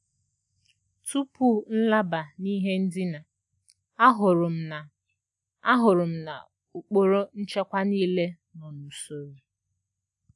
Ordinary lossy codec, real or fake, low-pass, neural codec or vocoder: none; real; 10.8 kHz; none